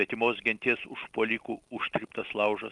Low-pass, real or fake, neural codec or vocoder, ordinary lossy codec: 10.8 kHz; real; none; Opus, 32 kbps